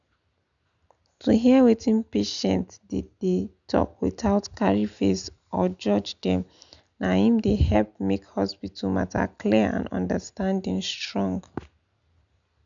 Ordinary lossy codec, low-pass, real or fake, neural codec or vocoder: none; 7.2 kHz; real; none